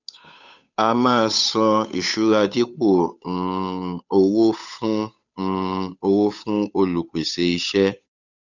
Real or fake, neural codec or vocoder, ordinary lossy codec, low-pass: fake; codec, 16 kHz, 8 kbps, FunCodec, trained on Chinese and English, 25 frames a second; none; 7.2 kHz